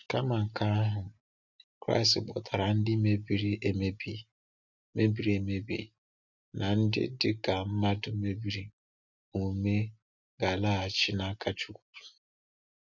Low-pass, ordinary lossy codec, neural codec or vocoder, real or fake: 7.2 kHz; none; none; real